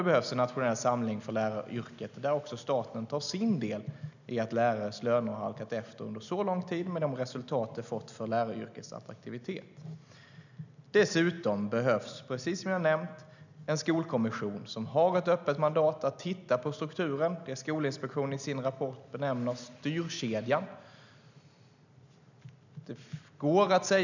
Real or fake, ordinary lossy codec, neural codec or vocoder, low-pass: real; none; none; 7.2 kHz